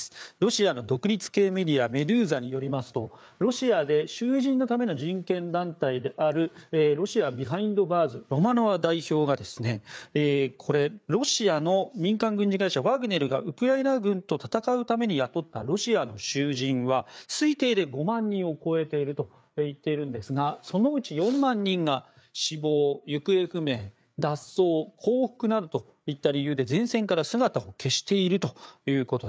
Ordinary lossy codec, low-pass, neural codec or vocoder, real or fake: none; none; codec, 16 kHz, 4 kbps, FreqCodec, larger model; fake